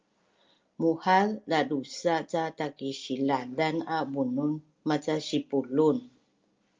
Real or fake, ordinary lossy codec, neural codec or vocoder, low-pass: real; Opus, 24 kbps; none; 7.2 kHz